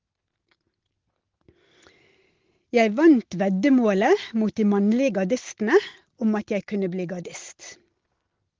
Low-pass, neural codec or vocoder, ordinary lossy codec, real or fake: 7.2 kHz; none; Opus, 16 kbps; real